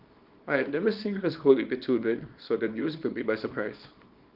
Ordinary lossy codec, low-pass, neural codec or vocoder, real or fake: Opus, 32 kbps; 5.4 kHz; codec, 24 kHz, 0.9 kbps, WavTokenizer, small release; fake